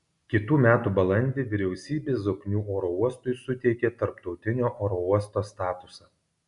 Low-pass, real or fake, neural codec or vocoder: 10.8 kHz; real; none